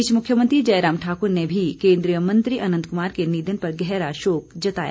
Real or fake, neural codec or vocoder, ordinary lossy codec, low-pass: real; none; none; none